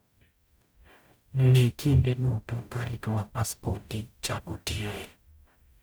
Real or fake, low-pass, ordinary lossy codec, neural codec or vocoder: fake; none; none; codec, 44.1 kHz, 0.9 kbps, DAC